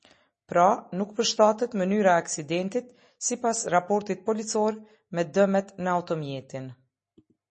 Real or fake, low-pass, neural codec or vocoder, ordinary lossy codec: real; 10.8 kHz; none; MP3, 32 kbps